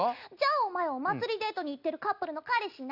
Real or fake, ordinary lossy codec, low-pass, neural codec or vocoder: real; none; 5.4 kHz; none